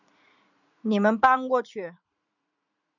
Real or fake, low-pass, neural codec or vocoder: fake; 7.2 kHz; vocoder, 44.1 kHz, 80 mel bands, Vocos